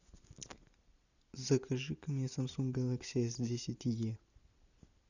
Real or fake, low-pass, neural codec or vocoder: real; 7.2 kHz; none